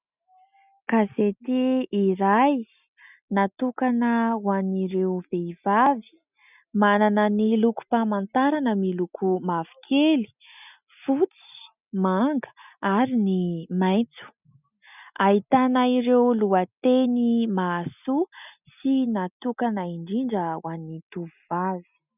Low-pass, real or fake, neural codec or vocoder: 3.6 kHz; real; none